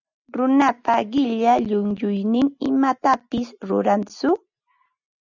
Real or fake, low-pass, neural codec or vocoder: real; 7.2 kHz; none